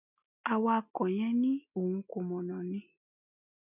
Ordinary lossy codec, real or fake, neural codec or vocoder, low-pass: none; real; none; 3.6 kHz